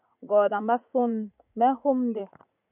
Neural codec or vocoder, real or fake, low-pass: vocoder, 44.1 kHz, 80 mel bands, Vocos; fake; 3.6 kHz